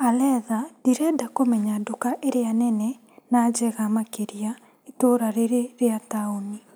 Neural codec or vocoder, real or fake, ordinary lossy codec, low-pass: none; real; none; none